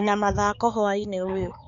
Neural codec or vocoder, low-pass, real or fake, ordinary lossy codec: codec, 16 kHz, 4 kbps, X-Codec, HuBERT features, trained on balanced general audio; 7.2 kHz; fake; none